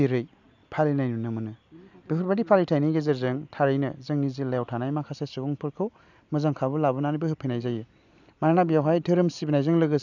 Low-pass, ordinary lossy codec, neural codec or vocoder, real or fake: 7.2 kHz; none; none; real